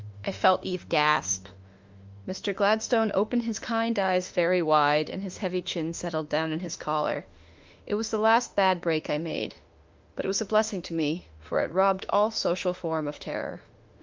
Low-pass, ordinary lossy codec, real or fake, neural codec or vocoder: 7.2 kHz; Opus, 32 kbps; fake; autoencoder, 48 kHz, 32 numbers a frame, DAC-VAE, trained on Japanese speech